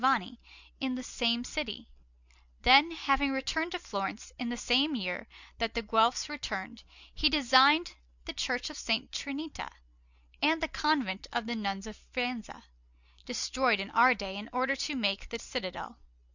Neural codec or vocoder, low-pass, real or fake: none; 7.2 kHz; real